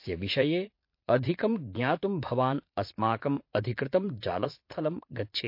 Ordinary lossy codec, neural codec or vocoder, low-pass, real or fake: MP3, 32 kbps; vocoder, 44.1 kHz, 80 mel bands, Vocos; 5.4 kHz; fake